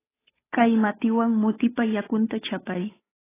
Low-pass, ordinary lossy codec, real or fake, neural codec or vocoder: 3.6 kHz; AAC, 16 kbps; fake; codec, 16 kHz, 8 kbps, FunCodec, trained on Chinese and English, 25 frames a second